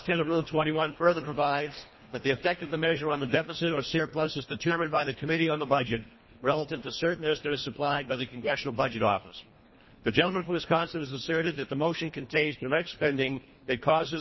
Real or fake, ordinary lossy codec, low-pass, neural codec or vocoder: fake; MP3, 24 kbps; 7.2 kHz; codec, 24 kHz, 1.5 kbps, HILCodec